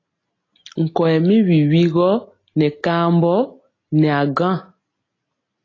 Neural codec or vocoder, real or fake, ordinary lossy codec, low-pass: none; real; AAC, 32 kbps; 7.2 kHz